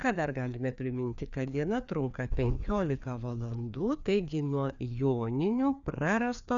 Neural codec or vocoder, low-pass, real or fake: codec, 16 kHz, 2 kbps, FreqCodec, larger model; 7.2 kHz; fake